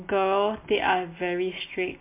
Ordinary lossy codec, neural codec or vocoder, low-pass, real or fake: MP3, 24 kbps; none; 3.6 kHz; real